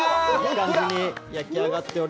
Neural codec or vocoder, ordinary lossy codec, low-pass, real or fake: none; none; none; real